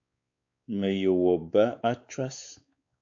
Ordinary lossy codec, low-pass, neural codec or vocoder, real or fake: AAC, 64 kbps; 7.2 kHz; codec, 16 kHz, 4 kbps, X-Codec, WavLM features, trained on Multilingual LibriSpeech; fake